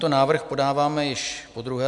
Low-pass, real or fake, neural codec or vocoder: 10.8 kHz; real; none